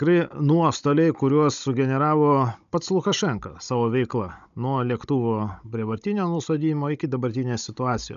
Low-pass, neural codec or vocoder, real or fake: 7.2 kHz; codec, 16 kHz, 16 kbps, FunCodec, trained on Chinese and English, 50 frames a second; fake